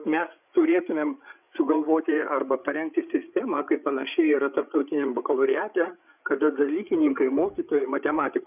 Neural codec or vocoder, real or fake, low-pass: codec, 16 kHz, 8 kbps, FreqCodec, larger model; fake; 3.6 kHz